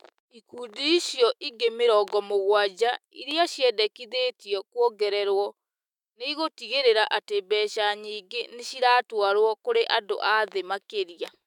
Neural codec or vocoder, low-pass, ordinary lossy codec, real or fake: autoencoder, 48 kHz, 128 numbers a frame, DAC-VAE, trained on Japanese speech; 19.8 kHz; none; fake